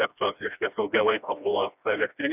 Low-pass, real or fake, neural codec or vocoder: 3.6 kHz; fake; codec, 16 kHz, 1 kbps, FreqCodec, smaller model